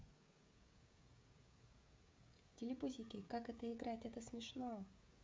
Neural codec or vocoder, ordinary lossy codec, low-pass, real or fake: codec, 16 kHz, 16 kbps, FreqCodec, smaller model; none; none; fake